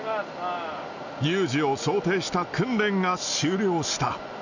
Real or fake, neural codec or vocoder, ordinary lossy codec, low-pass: real; none; none; 7.2 kHz